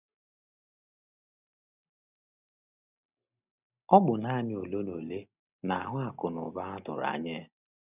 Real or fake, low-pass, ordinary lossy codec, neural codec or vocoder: real; 3.6 kHz; none; none